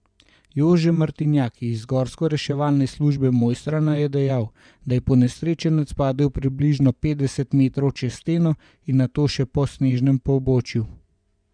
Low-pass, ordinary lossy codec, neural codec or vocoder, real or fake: 9.9 kHz; none; vocoder, 24 kHz, 100 mel bands, Vocos; fake